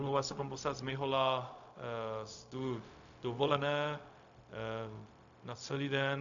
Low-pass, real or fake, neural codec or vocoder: 7.2 kHz; fake; codec, 16 kHz, 0.4 kbps, LongCat-Audio-Codec